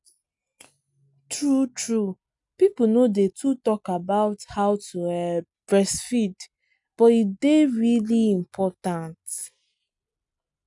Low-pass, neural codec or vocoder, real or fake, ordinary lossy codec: 10.8 kHz; none; real; none